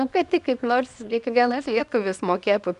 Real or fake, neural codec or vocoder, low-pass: fake; codec, 24 kHz, 0.9 kbps, WavTokenizer, small release; 10.8 kHz